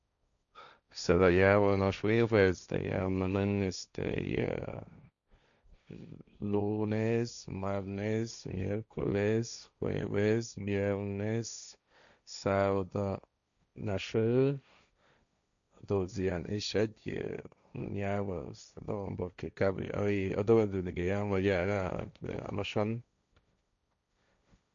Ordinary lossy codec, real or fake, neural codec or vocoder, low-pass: none; fake; codec, 16 kHz, 1.1 kbps, Voila-Tokenizer; 7.2 kHz